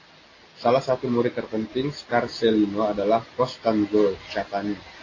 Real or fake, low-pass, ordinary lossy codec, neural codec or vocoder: real; 7.2 kHz; AAC, 32 kbps; none